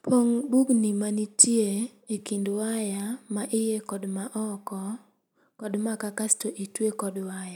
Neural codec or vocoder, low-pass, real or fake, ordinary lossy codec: none; none; real; none